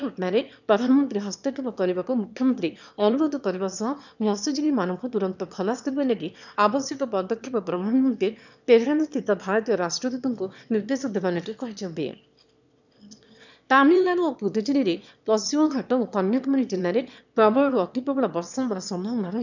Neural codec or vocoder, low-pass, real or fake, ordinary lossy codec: autoencoder, 22.05 kHz, a latent of 192 numbers a frame, VITS, trained on one speaker; 7.2 kHz; fake; none